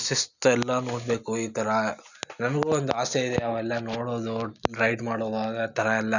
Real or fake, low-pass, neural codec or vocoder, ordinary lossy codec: real; 7.2 kHz; none; none